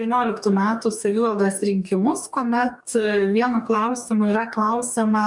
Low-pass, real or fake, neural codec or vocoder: 10.8 kHz; fake; codec, 44.1 kHz, 2.6 kbps, DAC